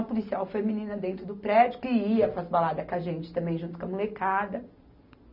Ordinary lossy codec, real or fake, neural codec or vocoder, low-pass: none; real; none; 5.4 kHz